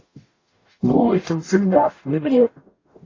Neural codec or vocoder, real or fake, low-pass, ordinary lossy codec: codec, 44.1 kHz, 0.9 kbps, DAC; fake; 7.2 kHz; AAC, 32 kbps